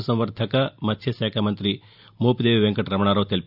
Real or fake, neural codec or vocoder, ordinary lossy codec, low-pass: real; none; none; 5.4 kHz